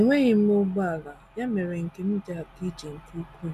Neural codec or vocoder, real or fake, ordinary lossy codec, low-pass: none; real; none; 14.4 kHz